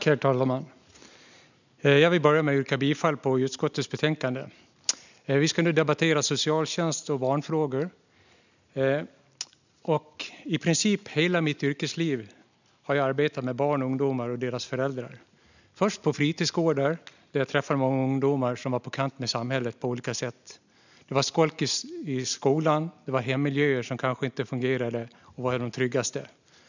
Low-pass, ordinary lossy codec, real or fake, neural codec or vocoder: 7.2 kHz; none; real; none